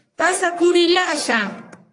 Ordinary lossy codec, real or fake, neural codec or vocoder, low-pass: AAC, 48 kbps; fake; codec, 44.1 kHz, 1.7 kbps, Pupu-Codec; 10.8 kHz